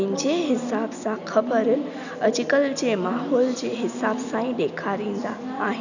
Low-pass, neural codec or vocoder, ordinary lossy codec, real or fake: 7.2 kHz; none; none; real